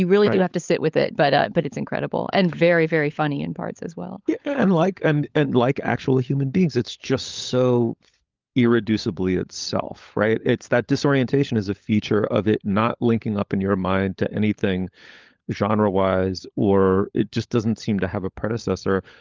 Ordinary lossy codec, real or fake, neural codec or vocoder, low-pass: Opus, 24 kbps; fake; codec, 16 kHz, 16 kbps, FunCodec, trained on LibriTTS, 50 frames a second; 7.2 kHz